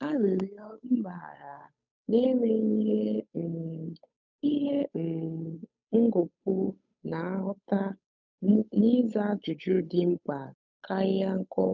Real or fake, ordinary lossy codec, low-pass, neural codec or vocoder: fake; none; 7.2 kHz; codec, 16 kHz, 8 kbps, FunCodec, trained on Chinese and English, 25 frames a second